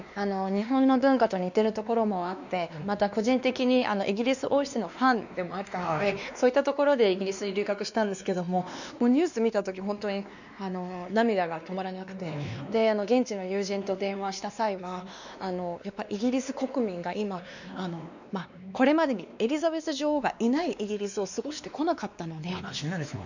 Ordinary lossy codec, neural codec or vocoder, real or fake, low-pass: none; codec, 16 kHz, 2 kbps, X-Codec, WavLM features, trained on Multilingual LibriSpeech; fake; 7.2 kHz